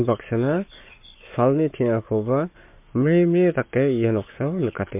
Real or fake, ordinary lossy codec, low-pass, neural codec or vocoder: fake; MP3, 32 kbps; 3.6 kHz; codec, 44.1 kHz, 7.8 kbps, Pupu-Codec